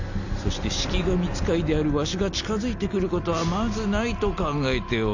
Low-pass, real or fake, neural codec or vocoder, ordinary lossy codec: 7.2 kHz; real; none; none